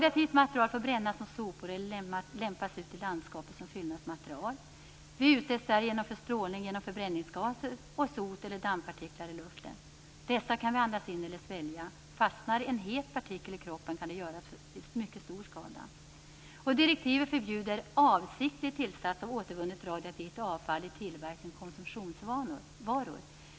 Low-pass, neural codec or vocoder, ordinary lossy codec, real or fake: none; none; none; real